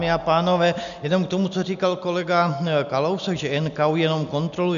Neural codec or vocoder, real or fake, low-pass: none; real; 7.2 kHz